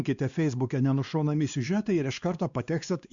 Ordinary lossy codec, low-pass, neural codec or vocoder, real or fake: Opus, 64 kbps; 7.2 kHz; codec, 16 kHz, 2 kbps, X-Codec, WavLM features, trained on Multilingual LibriSpeech; fake